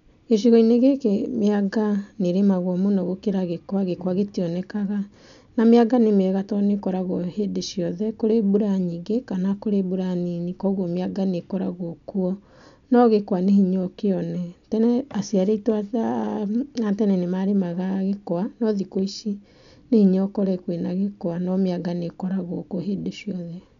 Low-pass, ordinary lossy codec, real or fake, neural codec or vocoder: 7.2 kHz; none; real; none